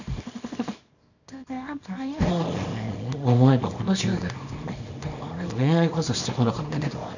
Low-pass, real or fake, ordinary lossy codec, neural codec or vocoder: 7.2 kHz; fake; none; codec, 24 kHz, 0.9 kbps, WavTokenizer, small release